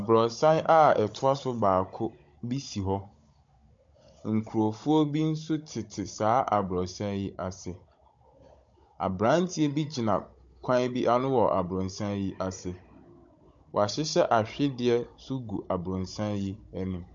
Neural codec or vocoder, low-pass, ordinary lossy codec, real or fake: codec, 16 kHz, 16 kbps, FunCodec, trained on Chinese and English, 50 frames a second; 7.2 kHz; MP3, 48 kbps; fake